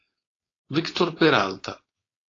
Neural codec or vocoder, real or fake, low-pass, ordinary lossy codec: codec, 16 kHz, 4.8 kbps, FACodec; fake; 7.2 kHz; AAC, 32 kbps